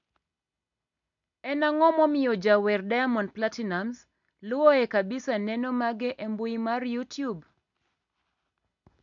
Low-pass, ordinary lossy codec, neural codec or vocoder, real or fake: 7.2 kHz; MP3, 96 kbps; none; real